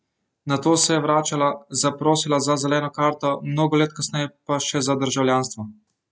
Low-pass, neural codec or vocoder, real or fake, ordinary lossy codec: none; none; real; none